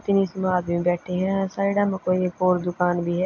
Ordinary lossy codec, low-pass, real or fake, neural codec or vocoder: AAC, 48 kbps; 7.2 kHz; real; none